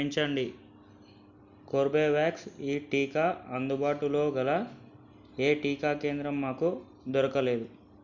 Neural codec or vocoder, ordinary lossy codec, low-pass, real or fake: none; none; 7.2 kHz; real